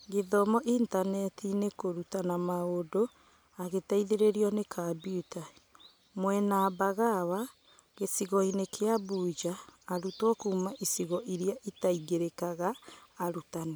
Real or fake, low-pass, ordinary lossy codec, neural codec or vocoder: real; none; none; none